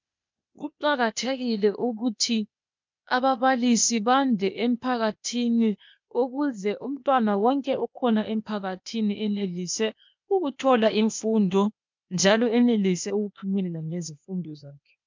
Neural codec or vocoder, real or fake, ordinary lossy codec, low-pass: codec, 16 kHz, 0.8 kbps, ZipCodec; fake; MP3, 64 kbps; 7.2 kHz